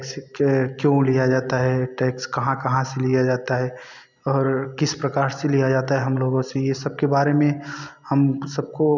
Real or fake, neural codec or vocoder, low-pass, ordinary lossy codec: real; none; 7.2 kHz; none